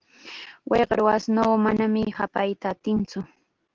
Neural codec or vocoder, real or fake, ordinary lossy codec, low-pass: none; real; Opus, 16 kbps; 7.2 kHz